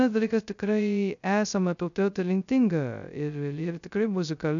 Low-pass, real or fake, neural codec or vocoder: 7.2 kHz; fake; codec, 16 kHz, 0.2 kbps, FocalCodec